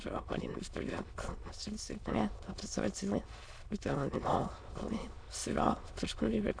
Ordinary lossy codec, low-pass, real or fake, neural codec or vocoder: AAC, 64 kbps; 9.9 kHz; fake; autoencoder, 22.05 kHz, a latent of 192 numbers a frame, VITS, trained on many speakers